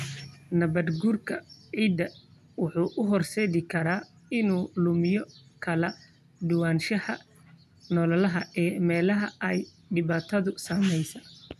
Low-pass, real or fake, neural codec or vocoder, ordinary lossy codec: 14.4 kHz; real; none; none